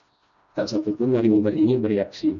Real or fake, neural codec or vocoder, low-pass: fake; codec, 16 kHz, 1 kbps, FreqCodec, smaller model; 7.2 kHz